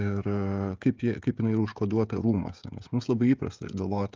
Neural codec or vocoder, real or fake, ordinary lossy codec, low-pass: codec, 16 kHz, 16 kbps, FreqCodec, smaller model; fake; Opus, 24 kbps; 7.2 kHz